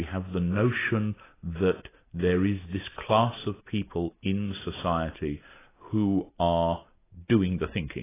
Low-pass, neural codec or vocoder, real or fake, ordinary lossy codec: 3.6 kHz; none; real; AAC, 16 kbps